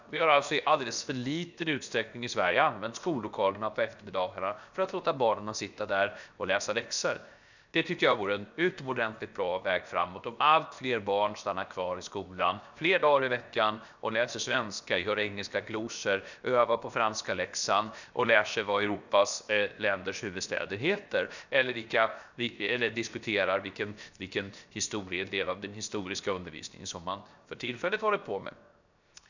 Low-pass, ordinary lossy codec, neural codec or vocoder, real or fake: 7.2 kHz; none; codec, 16 kHz, 0.7 kbps, FocalCodec; fake